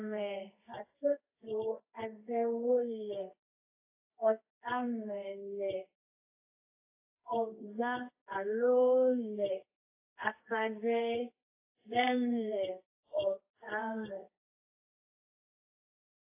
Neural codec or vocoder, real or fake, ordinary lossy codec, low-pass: codec, 24 kHz, 0.9 kbps, WavTokenizer, medium music audio release; fake; AAC, 32 kbps; 3.6 kHz